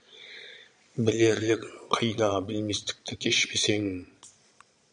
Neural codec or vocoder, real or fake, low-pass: vocoder, 22.05 kHz, 80 mel bands, Vocos; fake; 9.9 kHz